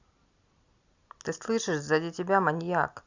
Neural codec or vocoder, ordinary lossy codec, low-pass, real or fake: none; Opus, 64 kbps; 7.2 kHz; real